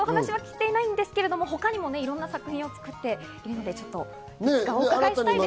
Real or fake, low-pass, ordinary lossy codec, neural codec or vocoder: real; none; none; none